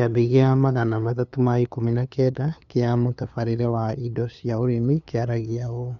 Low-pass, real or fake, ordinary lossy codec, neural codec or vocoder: 7.2 kHz; fake; none; codec, 16 kHz, 2 kbps, FunCodec, trained on LibriTTS, 25 frames a second